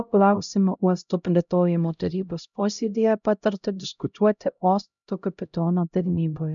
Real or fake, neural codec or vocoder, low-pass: fake; codec, 16 kHz, 0.5 kbps, X-Codec, HuBERT features, trained on LibriSpeech; 7.2 kHz